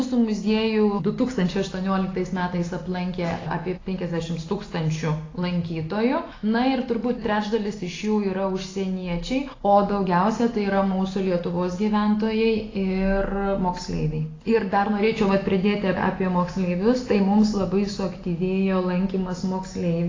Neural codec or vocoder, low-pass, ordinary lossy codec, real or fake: none; 7.2 kHz; AAC, 32 kbps; real